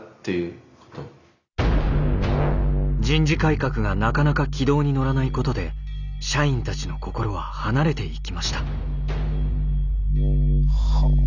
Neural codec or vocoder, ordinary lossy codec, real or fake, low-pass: none; none; real; 7.2 kHz